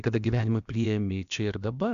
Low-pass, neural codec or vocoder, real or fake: 7.2 kHz; codec, 16 kHz, about 1 kbps, DyCAST, with the encoder's durations; fake